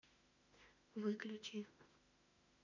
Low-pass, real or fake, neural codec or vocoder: 7.2 kHz; fake; autoencoder, 48 kHz, 32 numbers a frame, DAC-VAE, trained on Japanese speech